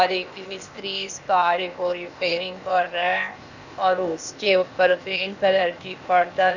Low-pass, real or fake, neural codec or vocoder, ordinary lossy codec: 7.2 kHz; fake; codec, 16 kHz, 0.8 kbps, ZipCodec; none